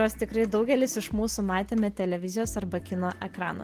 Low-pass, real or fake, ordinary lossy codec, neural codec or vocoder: 14.4 kHz; real; Opus, 16 kbps; none